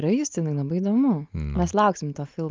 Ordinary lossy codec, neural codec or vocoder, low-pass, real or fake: Opus, 24 kbps; none; 7.2 kHz; real